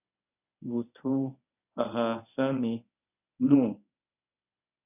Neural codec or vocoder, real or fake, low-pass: codec, 24 kHz, 0.9 kbps, WavTokenizer, medium speech release version 1; fake; 3.6 kHz